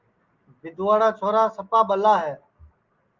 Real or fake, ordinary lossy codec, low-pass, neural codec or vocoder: real; Opus, 32 kbps; 7.2 kHz; none